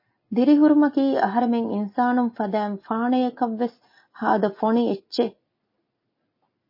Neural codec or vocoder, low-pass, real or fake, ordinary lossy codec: none; 5.4 kHz; real; MP3, 24 kbps